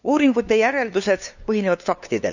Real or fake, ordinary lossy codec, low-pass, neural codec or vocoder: fake; none; 7.2 kHz; codec, 16 kHz, 2 kbps, FunCodec, trained on LibriTTS, 25 frames a second